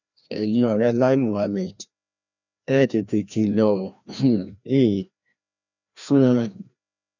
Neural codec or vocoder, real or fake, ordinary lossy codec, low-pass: codec, 16 kHz, 1 kbps, FreqCodec, larger model; fake; none; 7.2 kHz